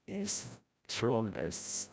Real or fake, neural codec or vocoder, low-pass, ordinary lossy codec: fake; codec, 16 kHz, 0.5 kbps, FreqCodec, larger model; none; none